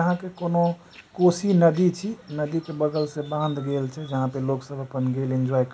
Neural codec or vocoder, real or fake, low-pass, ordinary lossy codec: none; real; none; none